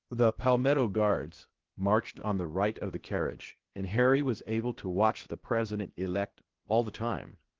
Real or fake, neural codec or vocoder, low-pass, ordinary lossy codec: fake; codec, 16 kHz, 0.8 kbps, ZipCodec; 7.2 kHz; Opus, 16 kbps